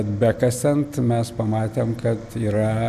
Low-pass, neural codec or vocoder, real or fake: 14.4 kHz; none; real